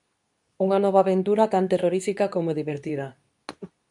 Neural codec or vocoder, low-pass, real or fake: codec, 24 kHz, 0.9 kbps, WavTokenizer, medium speech release version 2; 10.8 kHz; fake